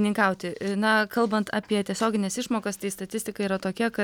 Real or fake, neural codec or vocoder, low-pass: real; none; 19.8 kHz